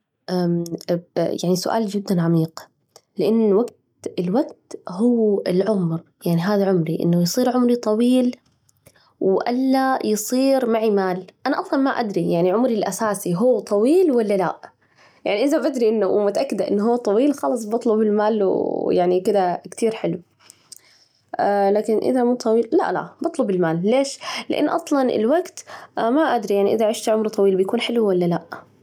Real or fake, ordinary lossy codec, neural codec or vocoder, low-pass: real; none; none; 19.8 kHz